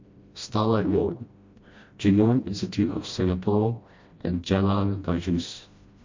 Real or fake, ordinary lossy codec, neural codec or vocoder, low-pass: fake; MP3, 48 kbps; codec, 16 kHz, 1 kbps, FreqCodec, smaller model; 7.2 kHz